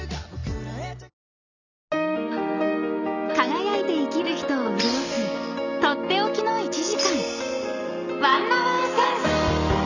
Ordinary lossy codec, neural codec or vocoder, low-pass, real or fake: none; none; 7.2 kHz; real